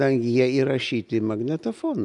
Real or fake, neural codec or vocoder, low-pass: real; none; 10.8 kHz